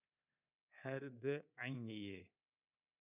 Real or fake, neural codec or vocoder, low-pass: fake; codec, 24 kHz, 3.1 kbps, DualCodec; 3.6 kHz